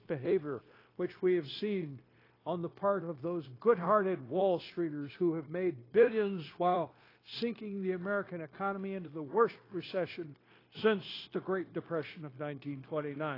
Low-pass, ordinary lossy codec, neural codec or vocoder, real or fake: 5.4 kHz; AAC, 24 kbps; codec, 16 kHz, 0.9 kbps, LongCat-Audio-Codec; fake